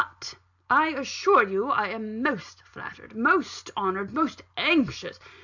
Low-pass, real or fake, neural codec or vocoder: 7.2 kHz; real; none